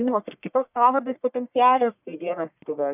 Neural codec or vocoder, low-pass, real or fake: codec, 44.1 kHz, 1.7 kbps, Pupu-Codec; 3.6 kHz; fake